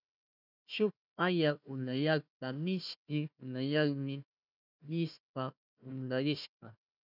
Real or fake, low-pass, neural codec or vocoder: fake; 5.4 kHz; codec, 16 kHz, 1 kbps, FunCodec, trained on Chinese and English, 50 frames a second